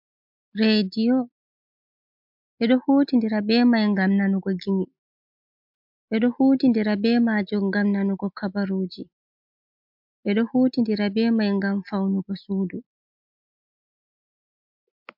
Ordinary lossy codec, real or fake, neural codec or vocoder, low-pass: MP3, 48 kbps; real; none; 5.4 kHz